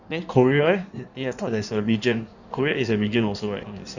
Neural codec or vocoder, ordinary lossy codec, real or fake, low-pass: codec, 16 kHz in and 24 kHz out, 1.1 kbps, FireRedTTS-2 codec; none; fake; 7.2 kHz